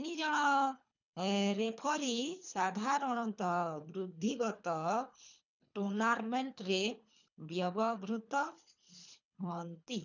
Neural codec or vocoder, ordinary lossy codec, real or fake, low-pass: codec, 24 kHz, 3 kbps, HILCodec; none; fake; 7.2 kHz